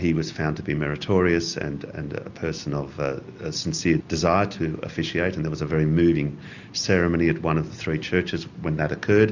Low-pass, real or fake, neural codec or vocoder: 7.2 kHz; real; none